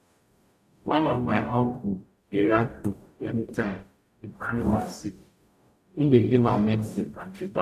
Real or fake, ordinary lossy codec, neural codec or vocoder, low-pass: fake; none; codec, 44.1 kHz, 0.9 kbps, DAC; 14.4 kHz